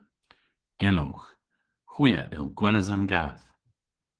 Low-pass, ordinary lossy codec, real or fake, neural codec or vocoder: 9.9 kHz; Opus, 16 kbps; fake; codec, 24 kHz, 1 kbps, SNAC